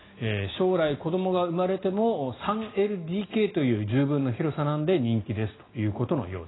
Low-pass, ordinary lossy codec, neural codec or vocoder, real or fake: 7.2 kHz; AAC, 16 kbps; none; real